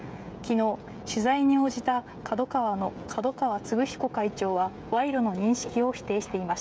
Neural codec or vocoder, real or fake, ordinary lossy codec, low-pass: codec, 16 kHz, 16 kbps, FreqCodec, smaller model; fake; none; none